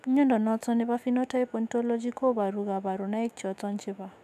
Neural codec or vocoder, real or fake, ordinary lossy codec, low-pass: autoencoder, 48 kHz, 128 numbers a frame, DAC-VAE, trained on Japanese speech; fake; AAC, 96 kbps; 14.4 kHz